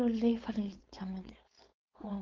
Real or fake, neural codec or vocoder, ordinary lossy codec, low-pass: fake; codec, 16 kHz, 4.8 kbps, FACodec; Opus, 32 kbps; 7.2 kHz